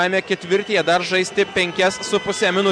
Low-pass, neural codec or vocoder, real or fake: 9.9 kHz; none; real